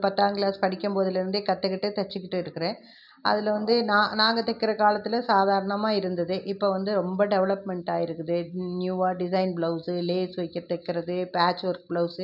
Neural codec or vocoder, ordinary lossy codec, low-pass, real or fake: none; none; 5.4 kHz; real